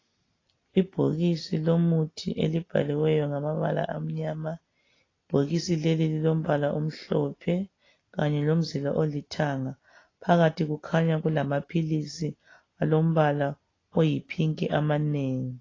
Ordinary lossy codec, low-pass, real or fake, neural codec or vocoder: AAC, 32 kbps; 7.2 kHz; real; none